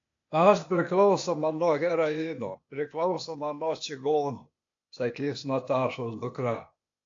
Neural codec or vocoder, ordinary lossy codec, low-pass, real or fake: codec, 16 kHz, 0.8 kbps, ZipCodec; AAC, 48 kbps; 7.2 kHz; fake